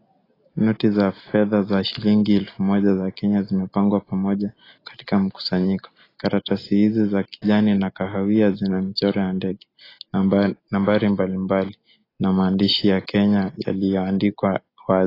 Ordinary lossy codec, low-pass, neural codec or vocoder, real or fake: AAC, 24 kbps; 5.4 kHz; none; real